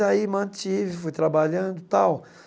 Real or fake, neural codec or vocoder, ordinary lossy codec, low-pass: real; none; none; none